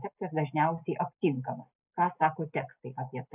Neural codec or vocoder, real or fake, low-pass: none; real; 3.6 kHz